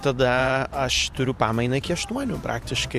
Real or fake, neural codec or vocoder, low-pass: fake; vocoder, 44.1 kHz, 128 mel bands every 512 samples, BigVGAN v2; 14.4 kHz